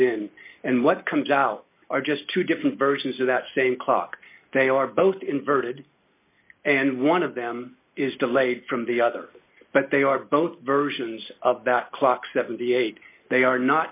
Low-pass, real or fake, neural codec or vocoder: 3.6 kHz; real; none